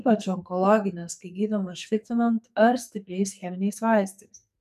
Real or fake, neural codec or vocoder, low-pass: fake; codec, 44.1 kHz, 2.6 kbps, SNAC; 14.4 kHz